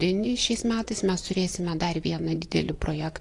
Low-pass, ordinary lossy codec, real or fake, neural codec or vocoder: 10.8 kHz; AAC, 48 kbps; fake; vocoder, 24 kHz, 100 mel bands, Vocos